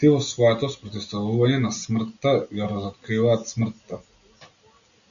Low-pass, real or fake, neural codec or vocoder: 7.2 kHz; real; none